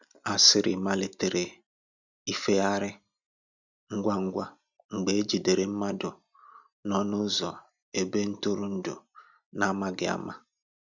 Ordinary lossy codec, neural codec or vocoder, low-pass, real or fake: none; none; 7.2 kHz; real